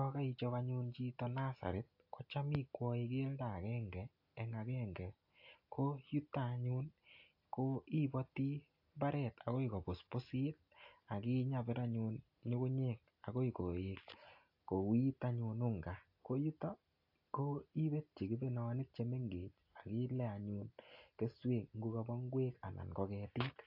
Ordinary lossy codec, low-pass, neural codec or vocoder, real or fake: AAC, 48 kbps; 5.4 kHz; none; real